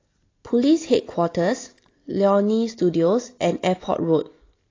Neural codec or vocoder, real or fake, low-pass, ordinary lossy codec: none; real; 7.2 kHz; AAC, 32 kbps